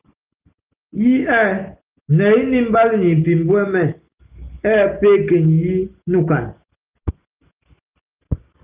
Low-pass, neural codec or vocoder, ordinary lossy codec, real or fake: 3.6 kHz; none; Opus, 24 kbps; real